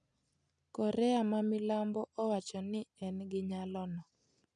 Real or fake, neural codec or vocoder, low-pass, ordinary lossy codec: real; none; 9.9 kHz; none